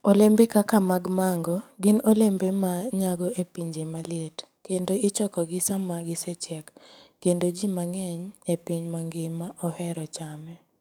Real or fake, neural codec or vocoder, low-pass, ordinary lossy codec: fake; codec, 44.1 kHz, 7.8 kbps, DAC; none; none